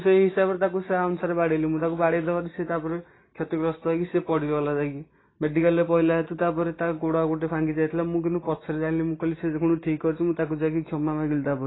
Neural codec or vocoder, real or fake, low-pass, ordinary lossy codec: none; real; 7.2 kHz; AAC, 16 kbps